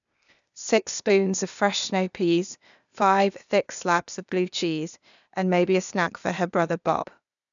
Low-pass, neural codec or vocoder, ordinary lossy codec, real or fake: 7.2 kHz; codec, 16 kHz, 0.8 kbps, ZipCodec; none; fake